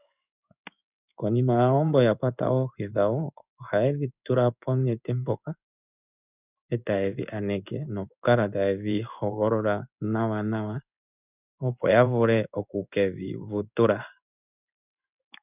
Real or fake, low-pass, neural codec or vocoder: fake; 3.6 kHz; codec, 16 kHz in and 24 kHz out, 1 kbps, XY-Tokenizer